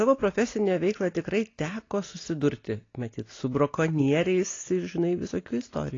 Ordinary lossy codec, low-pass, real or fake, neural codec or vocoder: AAC, 32 kbps; 7.2 kHz; real; none